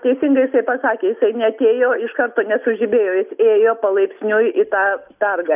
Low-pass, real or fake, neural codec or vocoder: 3.6 kHz; real; none